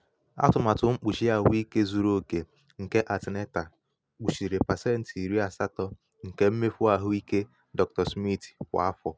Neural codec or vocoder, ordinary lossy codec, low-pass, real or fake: none; none; none; real